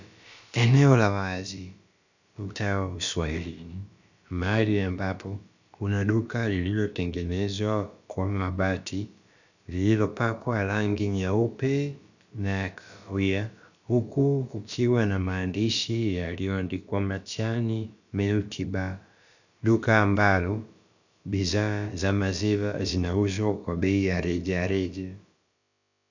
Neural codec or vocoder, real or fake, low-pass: codec, 16 kHz, about 1 kbps, DyCAST, with the encoder's durations; fake; 7.2 kHz